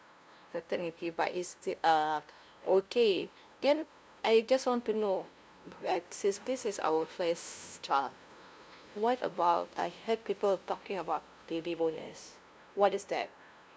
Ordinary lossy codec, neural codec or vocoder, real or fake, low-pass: none; codec, 16 kHz, 0.5 kbps, FunCodec, trained on LibriTTS, 25 frames a second; fake; none